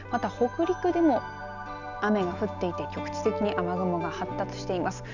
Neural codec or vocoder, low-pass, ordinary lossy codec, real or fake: none; 7.2 kHz; none; real